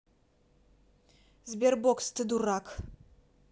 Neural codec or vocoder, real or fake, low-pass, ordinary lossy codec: none; real; none; none